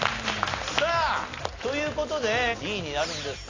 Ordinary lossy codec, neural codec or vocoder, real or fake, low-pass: AAC, 32 kbps; none; real; 7.2 kHz